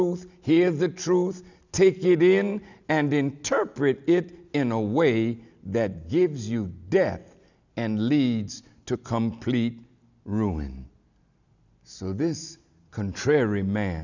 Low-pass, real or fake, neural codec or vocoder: 7.2 kHz; real; none